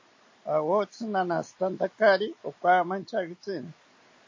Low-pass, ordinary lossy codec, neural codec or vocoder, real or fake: 7.2 kHz; MP3, 32 kbps; none; real